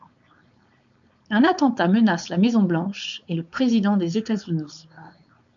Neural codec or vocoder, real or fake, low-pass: codec, 16 kHz, 4.8 kbps, FACodec; fake; 7.2 kHz